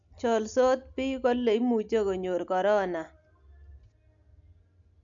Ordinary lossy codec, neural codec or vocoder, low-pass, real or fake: none; none; 7.2 kHz; real